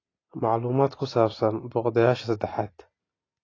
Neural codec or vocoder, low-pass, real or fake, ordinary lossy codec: none; 7.2 kHz; real; AAC, 32 kbps